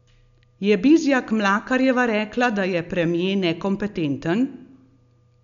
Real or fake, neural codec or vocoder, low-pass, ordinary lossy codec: real; none; 7.2 kHz; none